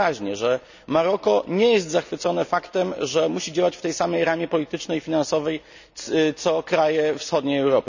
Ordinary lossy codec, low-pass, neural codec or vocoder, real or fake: none; 7.2 kHz; none; real